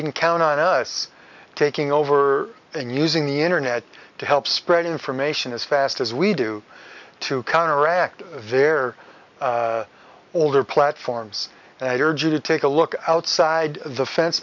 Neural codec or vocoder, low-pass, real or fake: none; 7.2 kHz; real